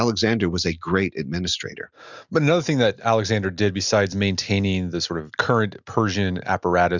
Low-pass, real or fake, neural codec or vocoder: 7.2 kHz; real; none